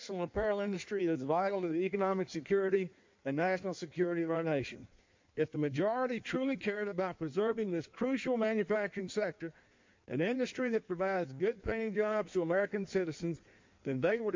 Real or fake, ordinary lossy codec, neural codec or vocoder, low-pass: fake; MP3, 48 kbps; codec, 16 kHz in and 24 kHz out, 1.1 kbps, FireRedTTS-2 codec; 7.2 kHz